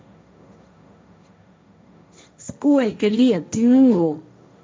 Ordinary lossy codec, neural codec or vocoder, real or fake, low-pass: none; codec, 16 kHz, 1.1 kbps, Voila-Tokenizer; fake; none